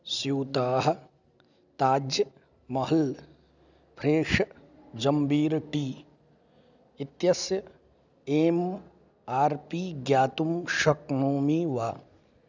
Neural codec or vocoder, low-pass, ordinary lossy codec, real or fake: none; 7.2 kHz; none; real